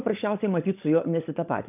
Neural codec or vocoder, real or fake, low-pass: codec, 16 kHz, 16 kbps, FunCodec, trained on LibriTTS, 50 frames a second; fake; 3.6 kHz